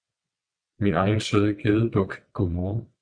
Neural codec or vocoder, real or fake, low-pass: vocoder, 22.05 kHz, 80 mel bands, WaveNeXt; fake; 9.9 kHz